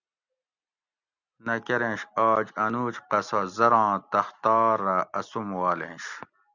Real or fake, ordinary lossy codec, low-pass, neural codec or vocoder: real; Opus, 64 kbps; 7.2 kHz; none